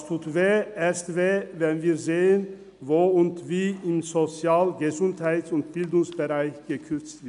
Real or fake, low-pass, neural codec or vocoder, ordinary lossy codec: real; 10.8 kHz; none; MP3, 96 kbps